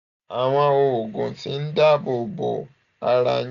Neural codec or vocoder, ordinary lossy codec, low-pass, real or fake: none; none; 7.2 kHz; real